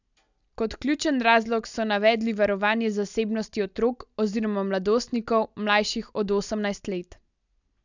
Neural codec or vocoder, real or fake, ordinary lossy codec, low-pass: none; real; none; 7.2 kHz